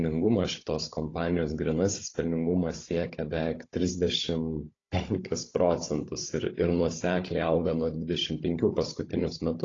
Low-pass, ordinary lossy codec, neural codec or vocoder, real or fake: 7.2 kHz; AAC, 32 kbps; codec, 16 kHz, 16 kbps, FunCodec, trained on Chinese and English, 50 frames a second; fake